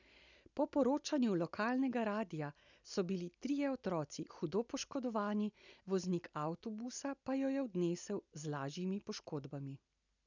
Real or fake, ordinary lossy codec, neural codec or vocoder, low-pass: real; none; none; 7.2 kHz